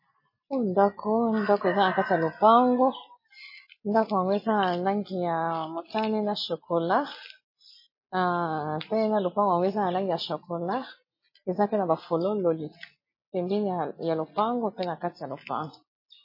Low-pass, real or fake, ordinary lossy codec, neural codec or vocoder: 5.4 kHz; real; MP3, 24 kbps; none